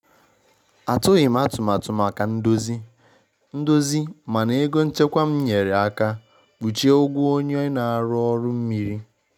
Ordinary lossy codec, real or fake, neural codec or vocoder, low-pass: none; real; none; 19.8 kHz